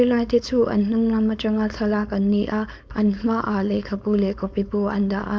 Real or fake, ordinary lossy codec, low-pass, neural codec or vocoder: fake; none; none; codec, 16 kHz, 4.8 kbps, FACodec